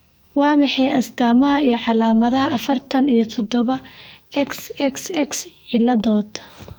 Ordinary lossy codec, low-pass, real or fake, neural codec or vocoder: none; none; fake; codec, 44.1 kHz, 2.6 kbps, SNAC